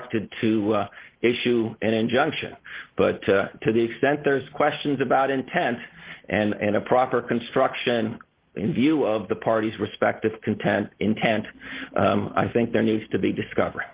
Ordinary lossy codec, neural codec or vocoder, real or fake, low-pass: Opus, 16 kbps; none; real; 3.6 kHz